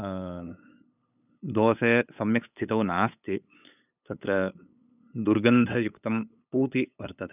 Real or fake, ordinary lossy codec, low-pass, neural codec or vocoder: fake; none; 3.6 kHz; codec, 16 kHz, 8 kbps, FunCodec, trained on LibriTTS, 25 frames a second